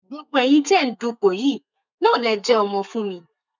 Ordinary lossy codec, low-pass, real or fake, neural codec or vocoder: none; 7.2 kHz; fake; codec, 32 kHz, 1.9 kbps, SNAC